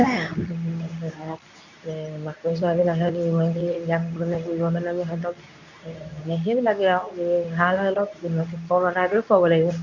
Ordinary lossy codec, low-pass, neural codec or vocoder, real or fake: none; 7.2 kHz; codec, 24 kHz, 0.9 kbps, WavTokenizer, medium speech release version 2; fake